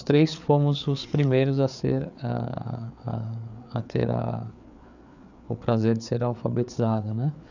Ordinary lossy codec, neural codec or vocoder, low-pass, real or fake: none; codec, 16 kHz, 4 kbps, FreqCodec, larger model; 7.2 kHz; fake